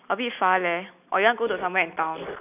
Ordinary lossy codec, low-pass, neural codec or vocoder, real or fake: none; 3.6 kHz; none; real